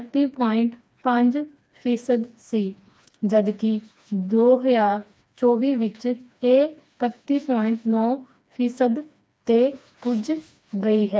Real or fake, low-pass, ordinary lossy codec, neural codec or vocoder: fake; none; none; codec, 16 kHz, 2 kbps, FreqCodec, smaller model